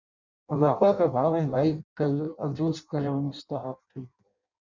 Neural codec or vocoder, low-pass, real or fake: codec, 16 kHz in and 24 kHz out, 0.6 kbps, FireRedTTS-2 codec; 7.2 kHz; fake